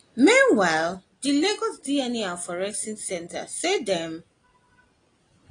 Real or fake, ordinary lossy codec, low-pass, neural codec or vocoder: real; AAC, 32 kbps; 9.9 kHz; none